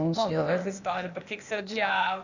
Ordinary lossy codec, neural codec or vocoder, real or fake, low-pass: none; codec, 16 kHz, 0.8 kbps, ZipCodec; fake; 7.2 kHz